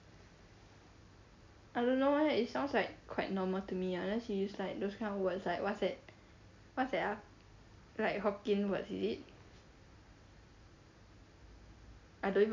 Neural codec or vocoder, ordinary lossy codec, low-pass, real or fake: none; MP3, 64 kbps; 7.2 kHz; real